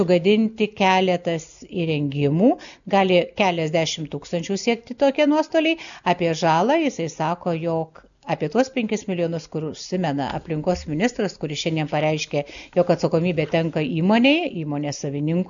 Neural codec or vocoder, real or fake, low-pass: none; real; 7.2 kHz